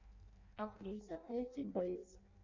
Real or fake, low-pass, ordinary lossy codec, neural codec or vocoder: fake; 7.2 kHz; MP3, 64 kbps; codec, 16 kHz in and 24 kHz out, 0.6 kbps, FireRedTTS-2 codec